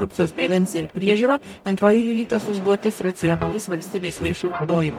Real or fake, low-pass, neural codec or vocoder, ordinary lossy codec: fake; 19.8 kHz; codec, 44.1 kHz, 0.9 kbps, DAC; MP3, 96 kbps